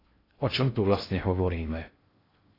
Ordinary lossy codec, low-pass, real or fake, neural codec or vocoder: AAC, 24 kbps; 5.4 kHz; fake; codec, 16 kHz in and 24 kHz out, 0.6 kbps, FocalCodec, streaming, 2048 codes